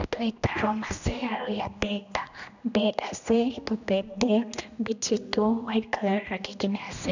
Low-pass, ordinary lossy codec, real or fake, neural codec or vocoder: 7.2 kHz; none; fake; codec, 16 kHz, 1 kbps, X-Codec, HuBERT features, trained on general audio